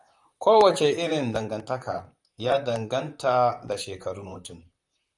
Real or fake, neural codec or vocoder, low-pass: fake; vocoder, 44.1 kHz, 128 mel bands, Pupu-Vocoder; 10.8 kHz